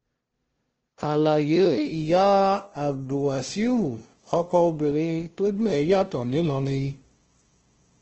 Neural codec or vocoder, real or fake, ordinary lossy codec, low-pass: codec, 16 kHz, 0.5 kbps, FunCodec, trained on LibriTTS, 25 frames a second; fake; Opus, 16 kbps; 7.2 kHz